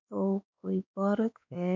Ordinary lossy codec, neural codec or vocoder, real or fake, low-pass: MP3, 48 kbps; codec, 16 kHz, 2 kbps, X-Codec, WavLM features, trained on Multilingual LibriSpeech; fake; 7.2 kHz